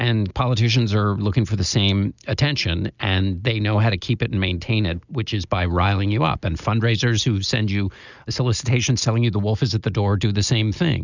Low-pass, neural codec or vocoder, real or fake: 7.2 kHz; none; real